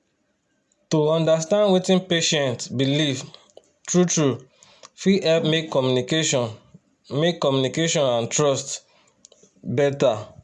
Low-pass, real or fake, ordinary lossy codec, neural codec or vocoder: none; real; none; none